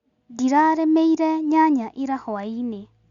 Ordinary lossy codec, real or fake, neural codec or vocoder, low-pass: Opus, 64 kbps; real; none; 7.2 kHz